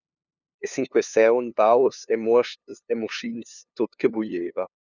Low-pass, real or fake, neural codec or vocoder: 7.2 kHz; fake; codec, 16 kHz, 2 kbps, FunCodec, trained on LibriTTS, 25 frames a second